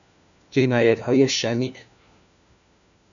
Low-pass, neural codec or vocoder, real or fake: 7.2 kHz; codec, 16 kHz, 1 kbps, FunCodec, trained on LibriTTS, 50 frames a second; fake